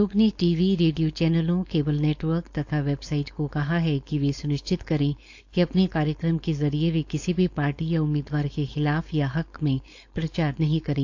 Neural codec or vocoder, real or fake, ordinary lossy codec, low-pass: codec, 16 kHz, 4.8 kbps, FACodec; fake; AAC, 48 kbps; 7.2 kHz